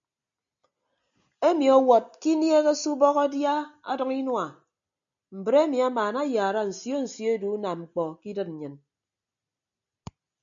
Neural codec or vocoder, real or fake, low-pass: none; real; 7.2 kHz